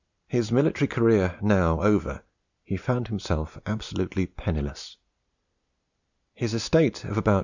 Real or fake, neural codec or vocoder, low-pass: real; none; 7.2 kHz